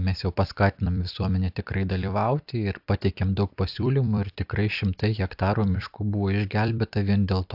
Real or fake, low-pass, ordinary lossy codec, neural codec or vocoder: fake; 5.4 kHz; AAC, 48 kbps; vocoder, 44.1 kHz, 128 mel bands every 256 samples, BigVGAN v2